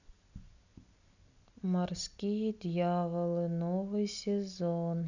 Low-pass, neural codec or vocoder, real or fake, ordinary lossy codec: 7.2 kHz; none; real; none